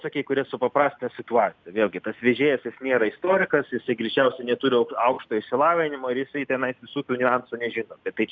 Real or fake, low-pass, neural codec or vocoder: real; 7.2 kHz; none